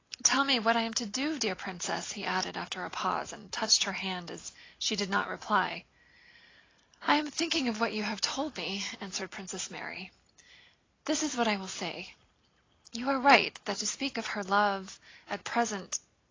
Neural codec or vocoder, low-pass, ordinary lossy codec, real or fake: none; 7.2 kHz; AAC, 32 kbps; real